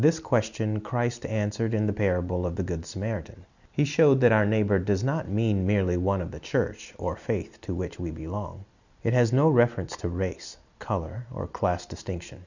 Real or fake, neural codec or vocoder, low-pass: real; none; 7.2 kHz